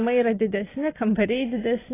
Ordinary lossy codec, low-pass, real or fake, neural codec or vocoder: AAC, 16 kbps; 3.6 kHz; real; none